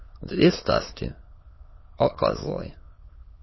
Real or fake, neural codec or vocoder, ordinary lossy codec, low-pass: fake; autoencoder, 22.05 kHz, a latent of 192 numbers a frame, VITS, trained on many speakers; MP3, 24 kbps; 7.2 kHz